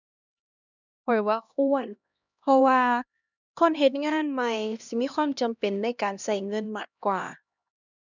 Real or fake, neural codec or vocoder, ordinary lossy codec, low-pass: fake; codec, 16 kHz, 1 kbps, X-Codec, HuBERT features, trained on LibriSpeech; none; 7.2 kHz